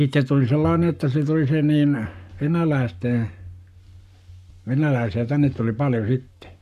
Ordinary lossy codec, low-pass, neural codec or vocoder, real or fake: none; 14.4 kHz; codec, 44.1 kHz, 7.8 kbps, Pupu-Codec; fake